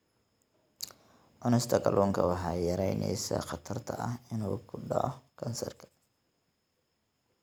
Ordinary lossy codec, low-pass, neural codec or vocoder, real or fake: none; none; none; real